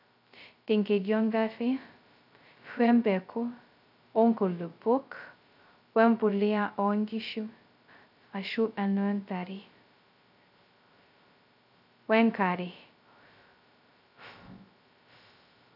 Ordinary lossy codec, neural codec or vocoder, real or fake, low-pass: none; codec, 16 kHz, 0.2 kbps, FocalCodec; fake; 5.4 kHz